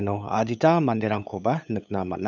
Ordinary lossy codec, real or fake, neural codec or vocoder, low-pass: none; fake; codec, 16 kHz, 8 kbps, FunCodec, trained on LibriTTS, 25 frames a second; none